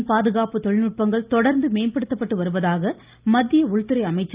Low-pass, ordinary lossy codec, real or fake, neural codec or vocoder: 3.6 kHz; Opus, 24 kbps; real; none